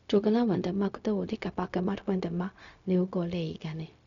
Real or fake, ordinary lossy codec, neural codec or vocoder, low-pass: fake; none; codec, 16 kHz, 0.4 kbps, LongCat-Audio-Codec; 7.2 kHz